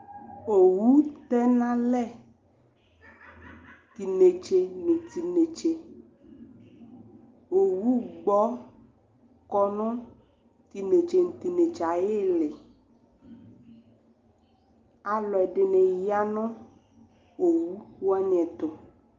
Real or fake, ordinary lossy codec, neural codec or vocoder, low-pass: real; Opus, 32 kbps; none; 7.2 kHz